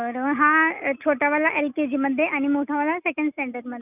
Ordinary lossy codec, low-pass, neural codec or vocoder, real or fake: none; 3.6 kHz; none; real